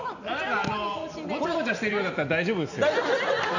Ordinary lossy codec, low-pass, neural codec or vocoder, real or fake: none; 7.2 kHz; none; real